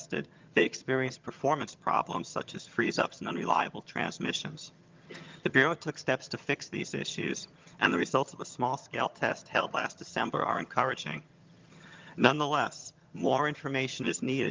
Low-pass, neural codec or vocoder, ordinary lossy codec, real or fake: 7.2 kHz; vocoder, 22.05 kHz, 80 mel bands, HiFi-GAN; Opus, 32 kbps; fake